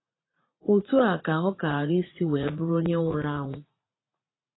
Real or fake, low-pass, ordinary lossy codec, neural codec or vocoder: fake; 7.2 kHz; AAC, 16 kbps; vocoder, 44.1 kHz, 80 mel bands, Vocos